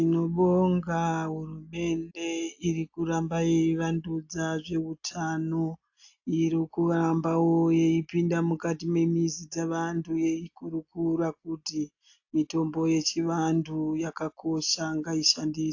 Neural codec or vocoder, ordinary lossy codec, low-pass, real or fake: none; AAC, 48 kbps; 7.2 kHz; real